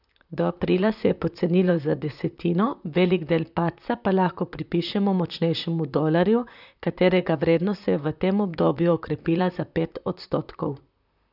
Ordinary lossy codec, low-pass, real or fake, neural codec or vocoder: none; 5.4 kHz; fake; vocoder, 44.1 kHz, 128 mel bands, Pupu-Vocoder